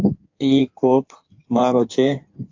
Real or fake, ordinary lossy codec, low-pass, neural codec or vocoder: fake; AAC, 48 kbps; 7.2 kHz; codec, 16 kHz in and 24 kHz out, 1.1 kbps, FireRedTTS-2 codec